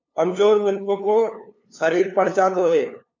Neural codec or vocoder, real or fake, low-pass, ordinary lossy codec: codec, 16 kHz, 2 kbps, FunCodec, trained on LibriTTS, 25 frames a second; fake; 7.2 kHz; MP3, 48 kbps